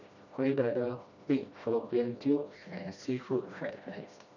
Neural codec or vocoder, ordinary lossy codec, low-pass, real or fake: codec, 16 kHz, 1 kbps, FreqCodec, smaller model; none; 7.2 kHz; fake